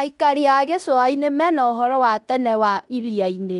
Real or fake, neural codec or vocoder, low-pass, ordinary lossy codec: fake; codec, 16 kHz in and 24 kHz out, 0.9 kbps, LongCat-Audio-Codec, fine tuned four codebook decoder; 10.8 kHz; none